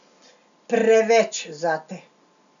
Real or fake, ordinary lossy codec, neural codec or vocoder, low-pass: real; none; none; 7.2 kHz